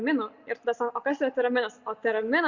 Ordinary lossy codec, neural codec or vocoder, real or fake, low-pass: Opus, 64 kbps; none; real; 7.2 kHz